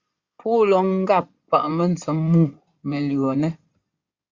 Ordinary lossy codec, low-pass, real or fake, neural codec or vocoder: Opus, 64 kbps; 7.2 kHz; fake; codec, 16 kHz in and 24 kHz out, 2.2 kbps, FireRedTTS-2 codec